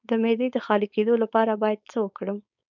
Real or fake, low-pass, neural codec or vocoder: fake; 7.2 kHz; codec, 16 kHz, 4.8 kbps, FACodec